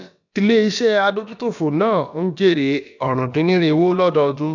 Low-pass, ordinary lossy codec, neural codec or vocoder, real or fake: 7.2 kHz; none; codec, 16 kHz, about 1 kbps, DyCAST, with the encoder's durations; fake